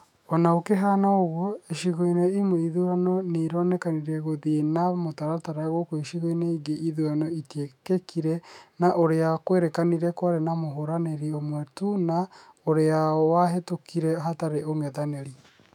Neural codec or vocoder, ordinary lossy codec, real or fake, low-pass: autoencoder, 48 kHz, 128 numbers a frame, DAC-VAE, trained on Japanese speech; none; fake; 19.8 kHz